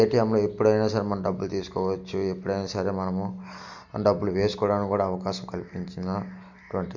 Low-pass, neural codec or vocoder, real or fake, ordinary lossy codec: 7.2 kHz; none; real; none